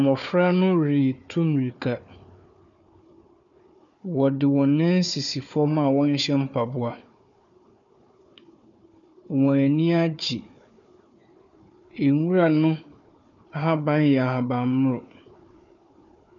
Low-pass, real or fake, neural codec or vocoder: 7.2 kHz; fake; codec, 16 kHz, 4 kbps, FunCodec, trained on Chinese and English, 50 frames a second